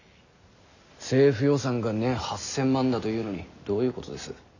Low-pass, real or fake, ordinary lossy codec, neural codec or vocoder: 7.2 kHz; real; none; none